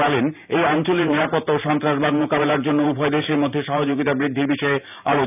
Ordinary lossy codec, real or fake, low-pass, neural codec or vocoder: none; fake; 3.6 kHz; vocoder, 44.1 kHz, 128 mel bands every 512 samples, BigVGAN v2